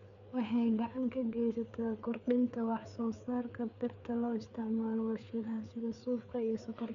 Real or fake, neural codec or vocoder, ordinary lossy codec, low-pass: fake; codec, 16 kHz, 4 kbps, FreqCodec, larger model; none; 7.2 kHz